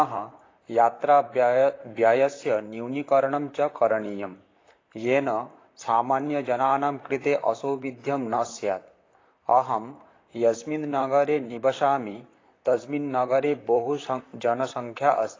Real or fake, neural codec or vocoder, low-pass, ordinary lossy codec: fake; vocoder, 44.1 kHz, 128 mel bands, Pupu-Vocoder; 7.2 kHz; AAC, 32 kbps